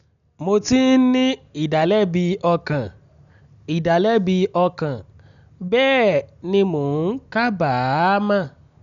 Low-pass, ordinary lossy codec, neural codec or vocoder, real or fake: 7.2 kHz; none; none; real